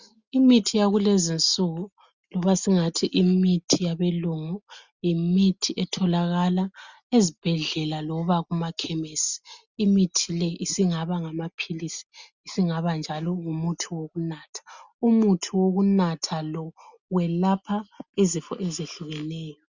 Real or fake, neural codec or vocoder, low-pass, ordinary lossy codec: real; none; 7.2 kHz; Opus, 64 kbps